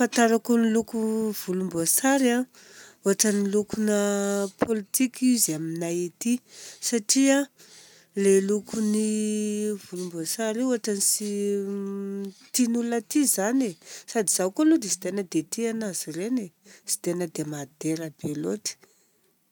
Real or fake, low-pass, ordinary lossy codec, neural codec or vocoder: real; none; none; none